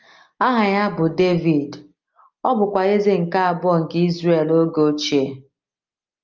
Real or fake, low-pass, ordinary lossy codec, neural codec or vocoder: real; 7.2 kHz; Opus, 24 kbps; none